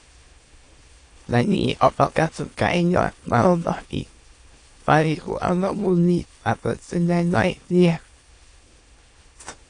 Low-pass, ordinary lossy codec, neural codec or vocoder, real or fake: 9.9 kHz; AAC, 48 kbps; autoencoder, 22.05 kHz, a latent of 192 numbers a frame, VITS, trained on many speakers; fake